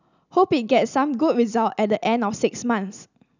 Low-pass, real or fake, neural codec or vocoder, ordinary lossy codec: 7.2 kHz; real; none; none